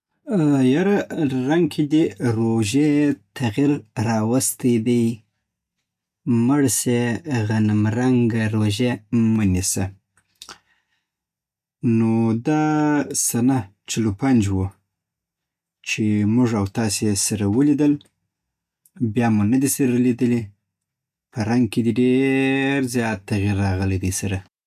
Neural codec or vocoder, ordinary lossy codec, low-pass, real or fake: none; none; 14.4 kHz; real